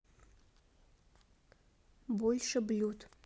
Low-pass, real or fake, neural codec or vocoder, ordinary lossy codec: none; real; none; none